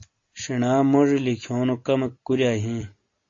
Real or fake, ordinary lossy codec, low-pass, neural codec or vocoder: real; AAC, 48 kbps; 7.2 kHz; none